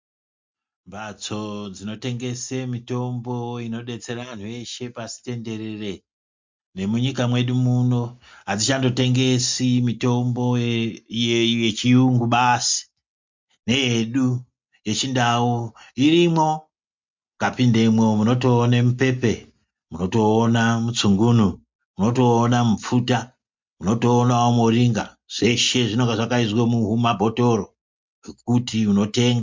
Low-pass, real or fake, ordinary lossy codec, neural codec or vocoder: 7.2 kHz; real; MP3, 64 kbps; none